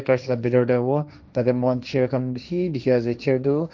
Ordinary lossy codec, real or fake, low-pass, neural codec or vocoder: none; fake; none; codec, 16 kHz, 1.1 kbps, Voila-Tokenizer